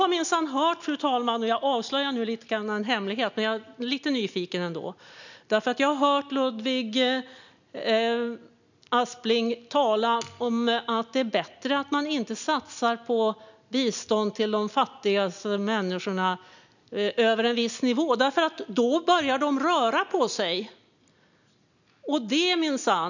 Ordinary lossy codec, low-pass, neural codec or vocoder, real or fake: none; 7.2 kHz; none; real